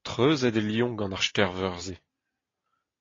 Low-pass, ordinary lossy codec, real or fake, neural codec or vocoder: 7.2 kHz; AAC, 32 kbps; real; none